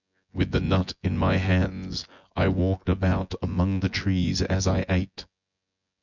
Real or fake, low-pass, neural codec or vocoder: fake; 7.2 kHz; vocoder, 24 kHz, 100 mel bands, Vocos